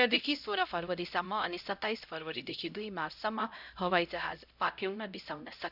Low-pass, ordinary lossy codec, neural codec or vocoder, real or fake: 5.4 kHz; none; codec, 16 kHz, 0.5 kbps, X-Codec, HuBERT features, trained on LibriSpeech; fake